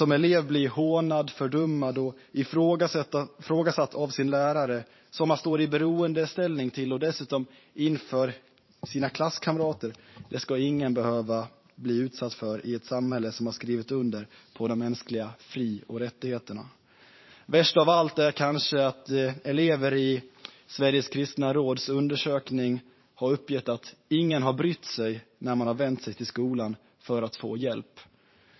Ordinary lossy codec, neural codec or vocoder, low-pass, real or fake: MP3, 24 kbps; vocoder, 44.1 kHz, 128 mel bands every 512 samples, BigVGAN v2; 7.2 kHz; fake